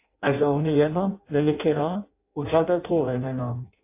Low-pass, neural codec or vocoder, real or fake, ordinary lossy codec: 3.6 kHz; codec, 16 kHz in and 24 kHz out, 0.6 kbps, FireRedTTS-2 codec; fake; AAC, 24 kbps